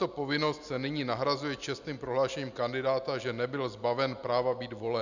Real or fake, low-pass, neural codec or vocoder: real; 7.2 kHz; none